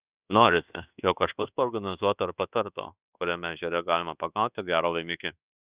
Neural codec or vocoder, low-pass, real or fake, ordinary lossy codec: codec, 24 kHz, 1.2 kbps, DualCodec; 3.6 kHz; fake; Opus, 64 kbps